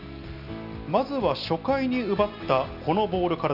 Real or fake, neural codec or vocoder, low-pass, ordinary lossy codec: real; none; 5.4 kHz; none